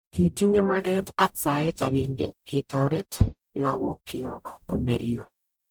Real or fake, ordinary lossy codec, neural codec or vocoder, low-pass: fake; none; codec, 44.1 kHz, 0.9 kbps, DAC; none